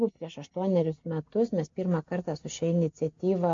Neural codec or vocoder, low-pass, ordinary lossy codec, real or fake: none; 7.2 kHz; MP3, 48 kbps; real